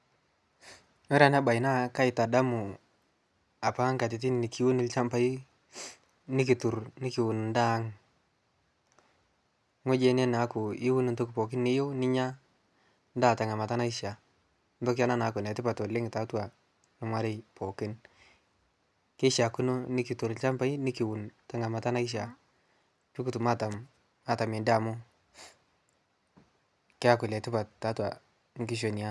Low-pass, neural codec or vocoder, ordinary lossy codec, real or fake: none; none; none; real